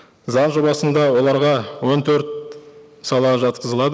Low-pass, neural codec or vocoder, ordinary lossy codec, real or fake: none; none; none; real